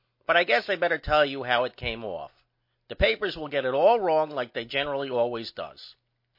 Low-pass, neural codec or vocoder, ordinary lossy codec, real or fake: 5.4 kHz; none; MP3, 32 kbps; real